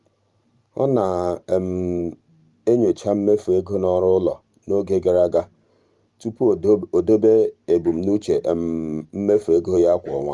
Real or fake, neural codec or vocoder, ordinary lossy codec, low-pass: fake; vocoder, 44.1 kHz, 128 mel bands every 512 samples, BigVGAN v2; Opus, 32 kbps; 10.8 kHz